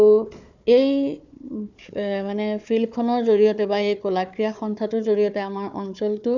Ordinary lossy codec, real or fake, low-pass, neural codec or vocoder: none; fake; 7.2 kHz; codec, 16 kHz, 4 kbps, FunCodec, trained on Chinese and English, 50 frames a second